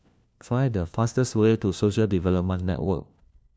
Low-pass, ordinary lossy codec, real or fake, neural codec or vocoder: none; none; fake; codec, 16 kHz, 1 kbps, FunCodec, trained on LibriTTS, 50 frames a second